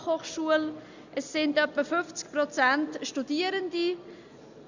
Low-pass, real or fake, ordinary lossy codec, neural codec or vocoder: 7.2 kHz; real; Opus, 64 kbps; none